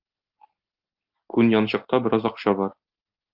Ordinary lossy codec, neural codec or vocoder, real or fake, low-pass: Opus, 32 kbps; none; real; 5.4 kHz